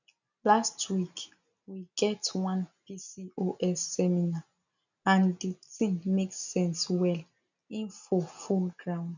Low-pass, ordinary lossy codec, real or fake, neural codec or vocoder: 7.2 kHz; none; real; none